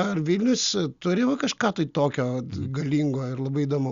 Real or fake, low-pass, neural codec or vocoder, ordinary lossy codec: real; 7.2 kHz; none; Opus, 64 kbps